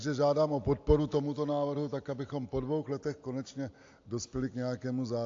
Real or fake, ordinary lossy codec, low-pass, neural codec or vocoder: real; AAC, 48 kbps; 7.2 kHz; none